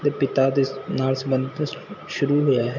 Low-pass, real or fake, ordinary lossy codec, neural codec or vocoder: 7.2 kHz; real; MP3, 64 kbps; none